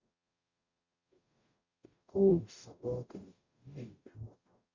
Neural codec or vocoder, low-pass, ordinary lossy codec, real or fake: codec, 44.1 kHz, 0.9 kbps, DAC; 7.2 kHz; Opus, 64 kbps; fake